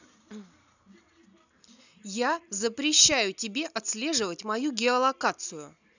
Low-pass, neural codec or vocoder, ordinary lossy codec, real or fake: 7.2 kHz; none; none; real